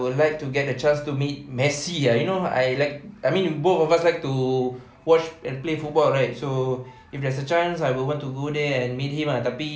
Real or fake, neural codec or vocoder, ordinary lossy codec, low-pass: real; none; none; none